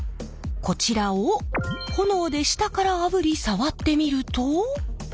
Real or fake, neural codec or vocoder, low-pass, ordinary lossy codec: real; none; none; none